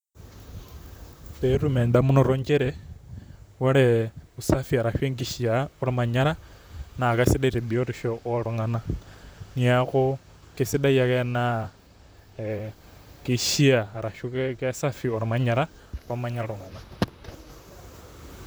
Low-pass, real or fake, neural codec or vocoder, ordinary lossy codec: none; fake; vocoder, 44.1 kHz, 128 mel bands, Pupu-Vocoder; none